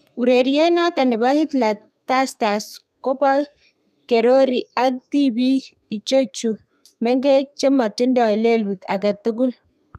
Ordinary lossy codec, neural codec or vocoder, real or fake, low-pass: none; codec, 32 kHz, 1.9 kbps, SNAC; fake; 14.4 kHz